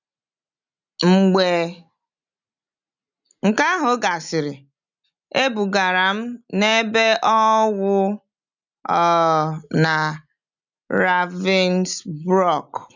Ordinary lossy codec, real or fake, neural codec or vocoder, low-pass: none; real; none; 7.2 kHz